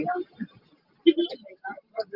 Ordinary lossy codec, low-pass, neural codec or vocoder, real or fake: Opus, 32 kbps; 5.4 kHz; none; real